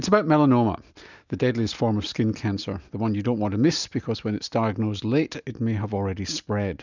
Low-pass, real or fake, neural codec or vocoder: 7.2 kHz; real; none